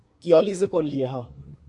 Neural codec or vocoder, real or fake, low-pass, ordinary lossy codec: codec, 24 kHz, 1 kbps, SNAC; fake; 10.8 kHz; MP3, 64 kbps